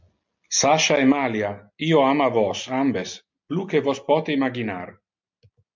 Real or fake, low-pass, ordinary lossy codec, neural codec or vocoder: real; 7.2 kHz; MP3, 64 kbps; none